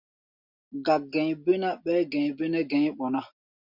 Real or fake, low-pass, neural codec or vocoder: real; 5.4 kHz; none